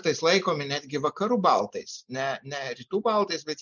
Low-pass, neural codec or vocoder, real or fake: 7.2 kHz; none; real